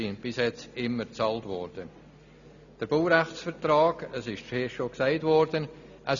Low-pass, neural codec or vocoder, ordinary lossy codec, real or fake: 7.2 kHz; none; none; real